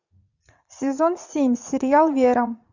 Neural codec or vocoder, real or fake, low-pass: vocoder, 44.1 kHz, 80 mel bands, Vocos; fake; 7.2 kHz